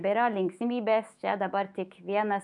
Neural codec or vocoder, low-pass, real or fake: autoencoder, 48 kHz, 128 numbers a frame, DAC-VAE, trained on Japanese speech; 10.8 kHz; fake